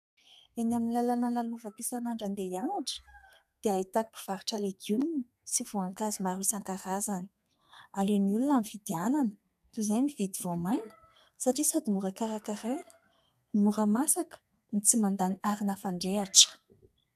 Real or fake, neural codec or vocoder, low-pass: fake; codec, 32 kHz, 1.9 kbps, SNAC; 14.4 kHz